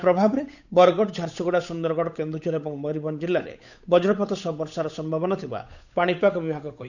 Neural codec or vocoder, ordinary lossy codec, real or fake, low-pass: codec, 16 kHz, 8 kbps, FunCodec, trained on Chinese and English, 25 frames a second; none; fake; 7.2 kHz